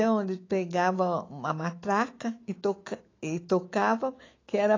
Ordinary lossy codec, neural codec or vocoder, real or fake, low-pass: AAC, 32 kbps; autoencoder, 48 kHz, 128 numbers a frame, DAC-VAE, trained on Japanese speech; fake; 7.2 kHz